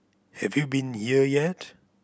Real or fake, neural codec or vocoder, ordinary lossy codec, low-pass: real; none; none; none